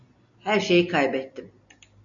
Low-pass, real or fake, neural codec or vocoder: 7.2 kHz; real; none